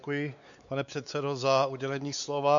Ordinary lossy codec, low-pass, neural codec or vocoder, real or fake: MP3, 96 kbps; 7.2 kHz; codec, 16 kHz, 4 kbps, X-Codec, WavLM features, trained on Multilingual LibriSpeech; fake